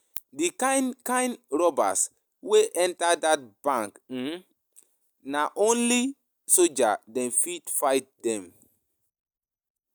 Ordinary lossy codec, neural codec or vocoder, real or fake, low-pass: none; none; real; none